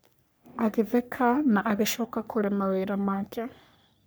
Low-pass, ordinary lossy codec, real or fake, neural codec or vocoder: none; none; fake; codec, 44.1 kHz, 3.4 kbps, Pupu-Codec